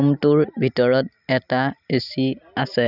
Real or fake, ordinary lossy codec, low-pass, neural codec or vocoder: real; none; 5.4 kHz; none